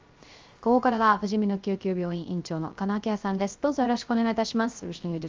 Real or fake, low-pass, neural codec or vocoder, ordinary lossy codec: fake; 7.2 kHz; codec, 16 kHz, 0.3 kbps, FocalCodec; Opus, 32 kbps